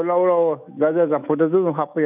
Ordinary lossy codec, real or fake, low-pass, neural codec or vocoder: none; fake; 3.6 kHz; autoencoder, 48 kHz, 128 numbers a frame, DAC-VAE, trained on Japanese speech